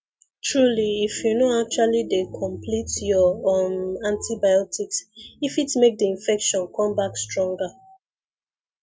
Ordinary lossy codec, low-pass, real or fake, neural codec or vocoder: none; none; real; none